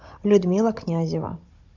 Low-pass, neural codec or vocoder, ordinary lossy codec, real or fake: 7.2 kHz; codec, 16 kHz, 16 kbps, FunCodec, trained on Chinese and English, 50 frames a second; MP3, 64 kbps; fake